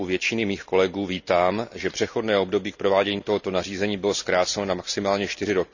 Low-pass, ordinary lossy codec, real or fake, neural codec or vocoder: 7.2 kHz; none; real; none